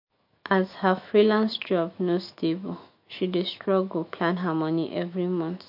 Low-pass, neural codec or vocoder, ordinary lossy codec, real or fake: 5.4 kHz; autoencoder, 48 kHz, 128 numbers a frame, DAC-VAE, trained on Japanese speech; MP3, 32 kbps; fake